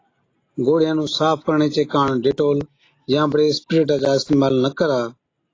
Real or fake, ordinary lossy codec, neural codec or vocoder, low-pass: real; AAC, 32 kbps; none; 7.2 kHz